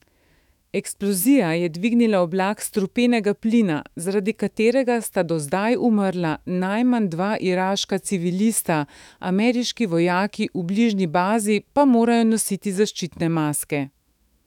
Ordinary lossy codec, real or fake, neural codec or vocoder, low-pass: none; fake; autoencoder, 48 kHz, 128 numbers a frame, DAC-VAE, trained on Japanese speech; 19.8 kHz